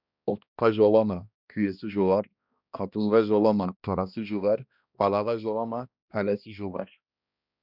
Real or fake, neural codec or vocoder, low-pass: fake; codec, 16 kHz, 1 kbps, X-Codec, HuBERT features, trained on balanced general audio; 5.4 kHz